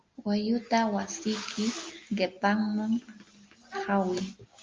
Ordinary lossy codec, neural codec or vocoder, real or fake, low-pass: Opus, 32 kbps; none; real; 7.2 kHz